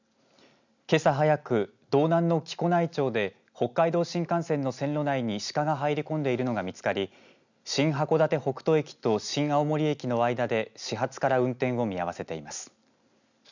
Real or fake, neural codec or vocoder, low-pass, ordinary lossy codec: real; none; 7.2 kHz; none